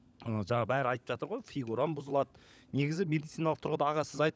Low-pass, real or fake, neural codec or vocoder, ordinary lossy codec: none; fake; codec, 16 kHz, 16 kbps, FunCodec, trained on LibriTTS, 50 frames a second; none